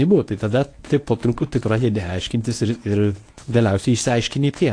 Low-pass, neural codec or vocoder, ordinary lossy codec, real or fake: 9.9 kHz; codec, 24 kHz, 0.9 kbps, WavTokenizer, medium speech release version 1; AAC, 48 kbps; fake